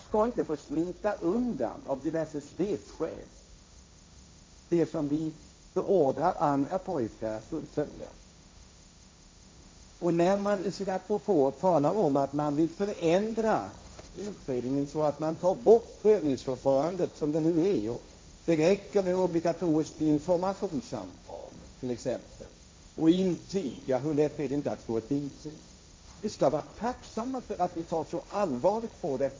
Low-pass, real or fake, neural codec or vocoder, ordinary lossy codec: none; fake; codec, 16 kHz, 1.1 kbps, Voila-Tokenizer; none